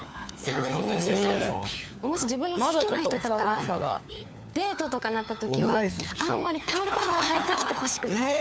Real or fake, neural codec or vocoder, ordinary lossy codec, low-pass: fake; codec, 16 kHz, 4 kbps, FunCodec, trained on LibriTTS, 50 frames a second; none; none